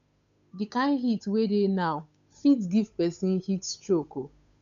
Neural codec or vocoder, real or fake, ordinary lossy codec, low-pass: codec, 16 kHz, 8 kbps, FunCodec, trained on Chinese and English, 25 frames a second; fake; none; 7.2 kHz